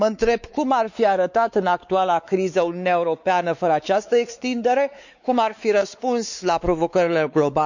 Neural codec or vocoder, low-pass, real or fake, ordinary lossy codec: codec, 16 kHz, 4 kbps, X-Codec, WavLM features, trained on Multilingual LibriSpeech; 7.2 kHz; fake; none